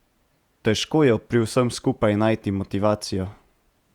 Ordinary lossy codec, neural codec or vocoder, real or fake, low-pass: Opus, 64 kbps; vocoder, 48 kHz, 128 mel bands, Vocos; fake; 19.8 kHz